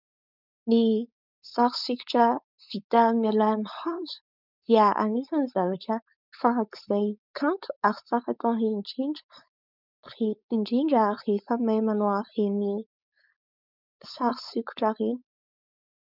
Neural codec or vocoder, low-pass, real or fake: codec, 16 kHz, 4.8 kbps, FACodec; 5.4 kHz; fake